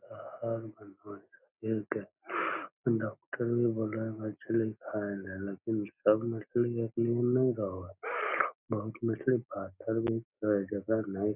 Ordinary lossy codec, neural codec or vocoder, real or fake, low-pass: none; none; real; 3.6 kHz